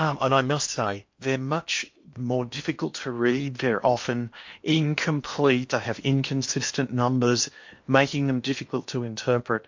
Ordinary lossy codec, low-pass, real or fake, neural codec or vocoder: MP3, 48 kbps; 7.2 kHz; fake; codec, 16 kHz in and 24 kHz out, 0.8 kbps, FocalCodec, streaming, 65536 codes